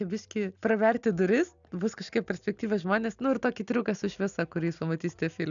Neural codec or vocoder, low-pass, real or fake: none; 7.2 kHz; real